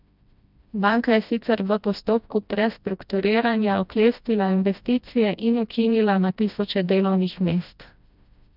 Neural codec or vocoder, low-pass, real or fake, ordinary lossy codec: codec, 16 kHz, 1 kbps, FreqCodec, smaller model; 5.4 kHz; fake; none